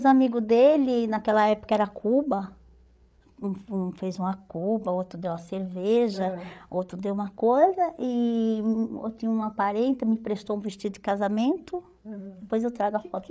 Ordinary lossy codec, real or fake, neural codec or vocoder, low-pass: none; fake; codec, 16 kHz, 8 kbps, FreqCodec, larger model; none